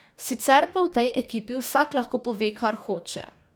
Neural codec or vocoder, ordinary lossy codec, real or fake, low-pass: codec, 44.1 kHz, 2.6 kbps, DAC; none; fake; none